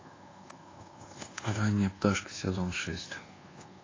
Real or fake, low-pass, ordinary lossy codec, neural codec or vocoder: fake; 7.2 kHz; AAC, 32 kbps; codec, 24 kHz, 1.2 kbps, DualCodec